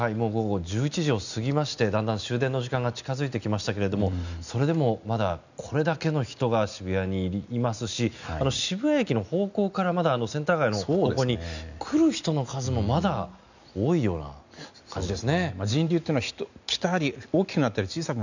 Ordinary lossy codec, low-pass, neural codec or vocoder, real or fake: none; 7.2 kHz; none; real